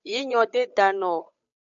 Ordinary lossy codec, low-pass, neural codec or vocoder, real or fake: MP3, 64 kbps; 7.2 kHz; codec, 16 kHz, 8 kbps, FunCodec, trained on Chinese and English, 25 frames a second; fake